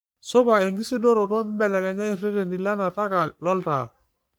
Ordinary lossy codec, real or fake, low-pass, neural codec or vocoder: none; fake; none; codec, 44.1 kHz, 3.4 kbps, Pupu-Codec